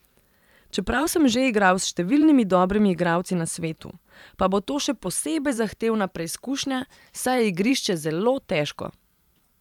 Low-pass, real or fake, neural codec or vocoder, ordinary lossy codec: 19.8 kHz; fake; vocoder, 44.1 kHz, 128 mel bands every 512 samples, BigVGAN v2; none